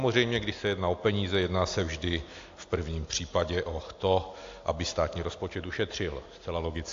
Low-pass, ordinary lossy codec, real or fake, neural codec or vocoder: 7.2 kHz; AAC, 48 kbps; real; none